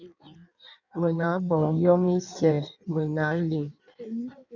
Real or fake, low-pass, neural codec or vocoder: fake; 7.2 kHz; codec, 16 kHz in and 24 kHz out, 1.1 kbps, FireRedTTS-2 codec